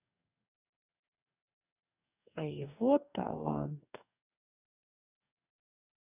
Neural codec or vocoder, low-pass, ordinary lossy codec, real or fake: codec, 44.1 kHz, 2.6 kbps, DAC; 3.6 kHz; none; fake